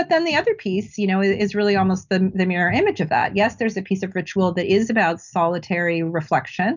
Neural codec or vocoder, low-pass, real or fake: none; 7.2 kHz; real